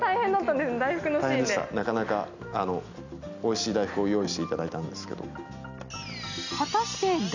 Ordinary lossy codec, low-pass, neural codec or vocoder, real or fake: none; 7.2 kHz; none; real